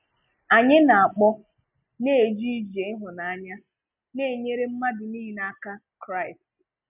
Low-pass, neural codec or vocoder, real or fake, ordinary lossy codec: 3.6 kHz; none; real; none